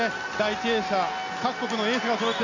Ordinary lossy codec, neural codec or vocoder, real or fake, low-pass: none; none; real; 7.2 kHz